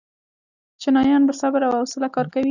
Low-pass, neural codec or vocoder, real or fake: 7.2 kHz; none; real